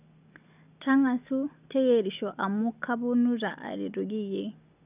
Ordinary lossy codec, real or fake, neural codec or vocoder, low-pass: none; real; none; 3.6 kHz